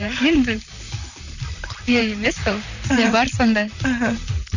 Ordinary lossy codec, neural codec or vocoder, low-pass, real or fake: none; vocoder, 44.1 kHz, 128 mel bands, Pupu-Vocoder; 7.2 kHz; fake